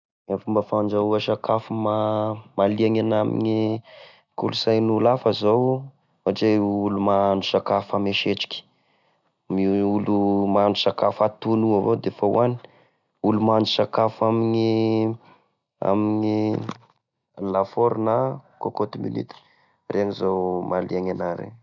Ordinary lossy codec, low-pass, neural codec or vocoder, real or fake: none; 7.2 kHz; none; real